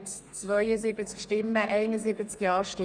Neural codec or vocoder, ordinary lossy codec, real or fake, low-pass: codec, 32 kHz, 1.9 kbps, SNAC; none; fake; 9.9 kHz